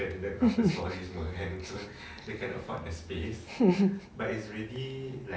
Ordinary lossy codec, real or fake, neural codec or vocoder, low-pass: none; real; none; none